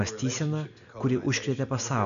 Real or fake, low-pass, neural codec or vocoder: real; 7.2 kHz; none